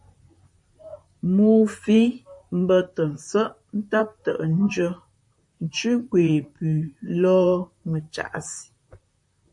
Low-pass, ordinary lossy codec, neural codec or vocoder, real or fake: 10.8 kHz; MP3, 48 kbps; vocoder, 44.1 kHz, 128 mel bands, Pupu-Vocoder; fake